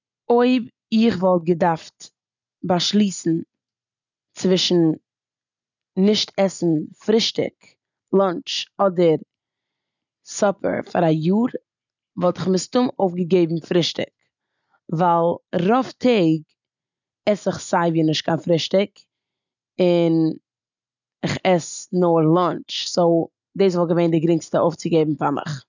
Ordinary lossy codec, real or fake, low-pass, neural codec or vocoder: none; real; 7.2 kHz; none